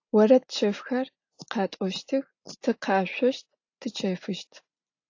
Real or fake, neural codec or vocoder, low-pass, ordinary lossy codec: real; none; 7.2 kHz; AAC, 48 kbps